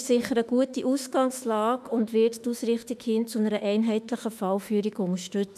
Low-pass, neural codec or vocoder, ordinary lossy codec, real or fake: 14.4 kHz; autoencoder, 48 kHz, 32 numbers a frame, DAC-VAE, trained on Japanese speech; none; fake